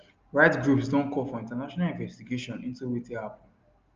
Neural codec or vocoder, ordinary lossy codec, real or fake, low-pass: none; Opus, 24 kbps; real; 7.2 kHz